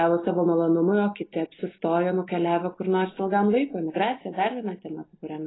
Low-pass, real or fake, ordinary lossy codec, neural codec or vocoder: 7.2 kHz; real; AAC, 16 kbps; none